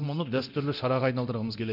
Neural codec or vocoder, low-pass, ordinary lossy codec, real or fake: codec, 24 kHz, 0.9 kbps, DualCodec; 5.4 kHz; none; fake